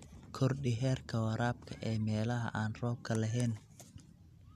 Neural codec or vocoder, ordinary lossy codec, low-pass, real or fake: none; none; 14.4 kHz; real